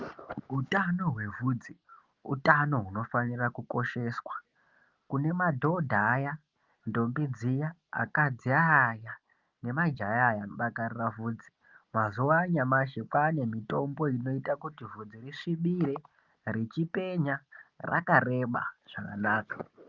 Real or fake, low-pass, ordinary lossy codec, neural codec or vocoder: real; 7.2 kHz; Opus, 32 kbps; none